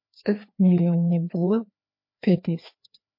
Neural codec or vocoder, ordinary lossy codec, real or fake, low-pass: codec, 16 kHz, 2 kbps, FreqCodec, larger model; MP3, 32 kbps; fake; 5.4 kHz